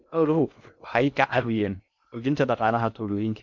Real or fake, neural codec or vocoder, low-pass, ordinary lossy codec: fake; codec, 16 kHz in and 24 kHz out, 0.6 kbps, FocalCodec, streaming, 2048 codes; 7.2 kHz; none